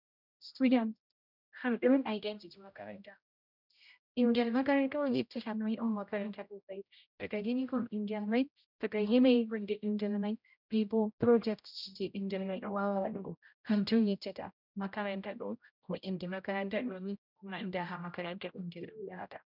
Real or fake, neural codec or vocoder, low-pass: fake; codec, 16 kHz, 0.5 kbps, X-Codec, HuBERT features, trained on general audio; 5.4 kHz